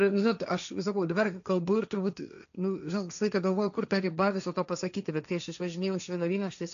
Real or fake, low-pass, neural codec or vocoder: fake; 7.2 kHz; codec, 16 kHz, 1.1 kbps, Voila-Tokenizer